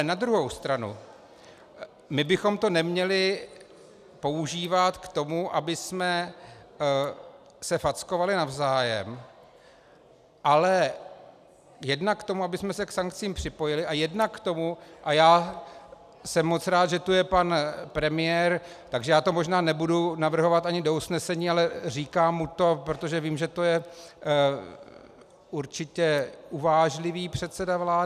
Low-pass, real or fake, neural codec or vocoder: 14.4 kHz; real; none